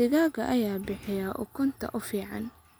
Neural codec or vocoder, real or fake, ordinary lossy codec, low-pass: none; real; none; none